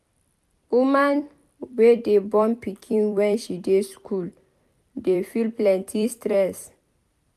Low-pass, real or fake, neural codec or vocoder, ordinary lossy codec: 14.4 kHz; fake; vocoder, 44.1 kHz, 128 mel bands every 256 samples, BigVGAN v2; MP3, 96 kbps